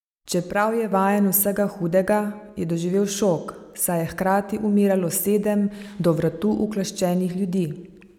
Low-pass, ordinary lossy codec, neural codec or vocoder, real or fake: 19.8 kHz; none; none; real